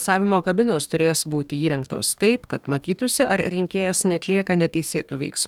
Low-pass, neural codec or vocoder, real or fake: 19.8 kHz; codec, 44.1 kHz, 2.6 kbps, DAC; fake